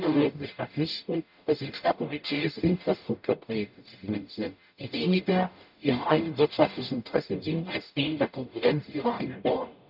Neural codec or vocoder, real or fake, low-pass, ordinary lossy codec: codec, 44.1 kHz, 0.9 kbps, DAC; fake; 5.4 kHz; none